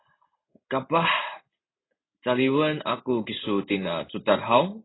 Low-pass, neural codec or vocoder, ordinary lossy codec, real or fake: 7.2 kHz; none; AAC, 16 kbps; real